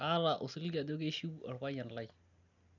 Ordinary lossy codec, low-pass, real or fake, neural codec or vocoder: none; 7.2 kHz; real; none